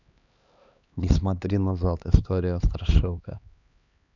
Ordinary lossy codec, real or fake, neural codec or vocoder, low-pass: none; fake; codec, 16 kHz, 2 kbps, X-Codec, HuBERT features, trained on LibriSpeech; 7.2 kHz